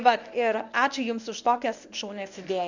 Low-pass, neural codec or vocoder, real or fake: 7.2 kHz; codec, 24 kHz, 0.9 kbps, WavTokenizer, medium speech release version 1; fake